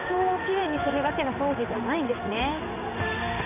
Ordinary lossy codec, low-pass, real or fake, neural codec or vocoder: none; 3.6 kHz; fake; codec, 16 kHz in and 24 kHz out, 1 kbps, XY-Tokenizer